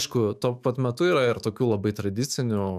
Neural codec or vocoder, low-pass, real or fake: autoencoder, 48 kHz, 128 numbers a frame, DAC-VAE, trained on Japanese speech; 14.4 kHz; fake